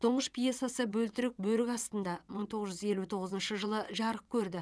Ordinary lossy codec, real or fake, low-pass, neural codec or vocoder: none; fake; none; vocoder, 22.05 kHz, 80 mel bands, WaveNeXt